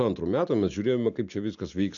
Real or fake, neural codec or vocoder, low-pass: real; none; 7.2 kHz